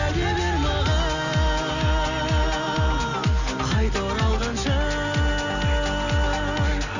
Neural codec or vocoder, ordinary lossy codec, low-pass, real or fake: none; none; 7.2 kHz; real